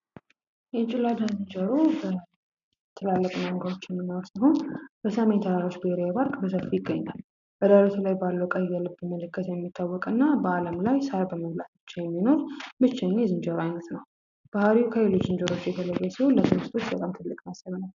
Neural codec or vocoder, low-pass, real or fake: none; 7.2 kHz; real